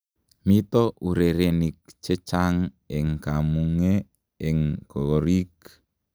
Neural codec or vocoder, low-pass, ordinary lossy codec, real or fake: none; none; none; real